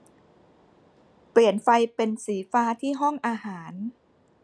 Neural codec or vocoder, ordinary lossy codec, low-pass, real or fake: none; none; none; real